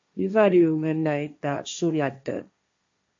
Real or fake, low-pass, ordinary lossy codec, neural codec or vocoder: fake; 7.2 kHz; MP3, 48 kbps; codec, 16 kHz, 1.1 kbps, Voila-Tokenizer